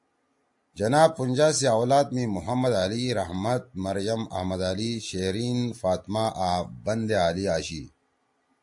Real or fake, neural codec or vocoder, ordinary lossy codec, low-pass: real; none; AAC, 64 kbps; 10.8 kHz